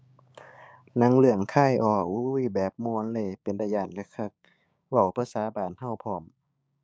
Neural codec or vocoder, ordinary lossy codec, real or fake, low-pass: codec, 16 kHz, 6 kbps, DAC; none; fake; none